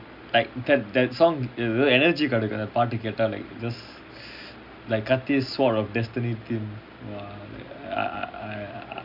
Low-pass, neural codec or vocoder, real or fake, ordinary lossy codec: 5.4 kHz; none; real; none